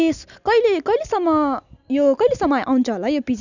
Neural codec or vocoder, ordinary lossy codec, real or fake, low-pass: none; none; real; 7.2 kHz